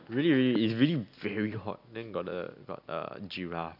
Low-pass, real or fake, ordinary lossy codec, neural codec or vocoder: 5.4 kHz; real; none; none